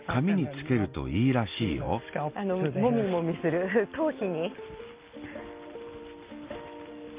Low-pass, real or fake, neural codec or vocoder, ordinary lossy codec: 3.6 kHz; real; none; Opus, 24 kbps